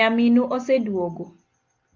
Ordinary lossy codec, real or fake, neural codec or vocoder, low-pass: Opus, 24 kbps; real; none; 7.2 kHz